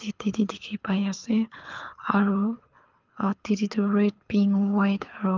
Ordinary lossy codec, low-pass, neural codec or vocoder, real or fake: Opus, 24 kbps; 7.2 kHz; codec, 24 kHz, 6 kbps, HILCodec; fake